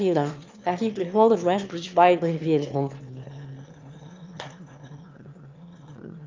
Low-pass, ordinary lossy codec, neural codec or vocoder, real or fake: 7.2 kHz; Opus, 24 kbps; autoencoder, 22.05 kHz, a latent of 192 numbers a frame, VITS, trained on one speaker; fake